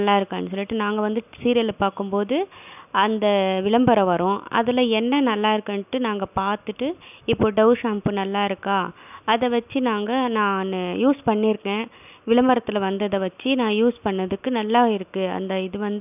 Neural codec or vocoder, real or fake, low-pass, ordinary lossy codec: none; real; 3.6 kHz; none